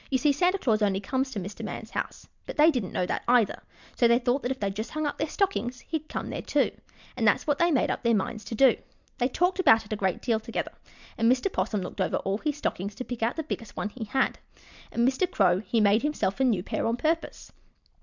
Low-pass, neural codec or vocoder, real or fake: 7.2 kHz; none; real